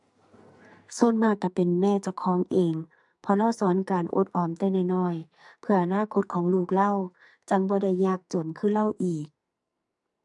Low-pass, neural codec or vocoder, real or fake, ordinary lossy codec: 10.8 kHz; codec, 44.1 kHz, 2.6 kbps, SNAC; fake; none